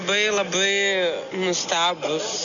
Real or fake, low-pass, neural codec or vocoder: real; 7.2 kHz; none